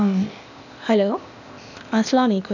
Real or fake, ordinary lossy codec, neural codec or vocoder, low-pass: fake; none; codec, 16 kHz, 0.8 kbps, ZipCodec; 7.2 kHz